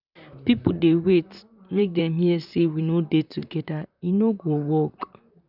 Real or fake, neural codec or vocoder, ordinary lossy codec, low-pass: fake; vocoder, 44.1 kHz, 128 mel bands, Pupu-Vocoder; none; 5.4 kHz